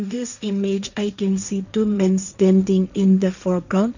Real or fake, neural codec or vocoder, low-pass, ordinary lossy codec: fake; codec, 16 kHz, 1.1 kbps, Voila-Tokenizer; 7.2 kHz; none